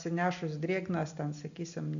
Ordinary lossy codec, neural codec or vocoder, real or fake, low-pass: AAC, 64 kbps; none; real; 7.2 kHz